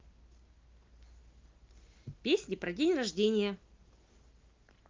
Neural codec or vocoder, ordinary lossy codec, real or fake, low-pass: none; Opus, 32 kbps; real; 7.2 kHz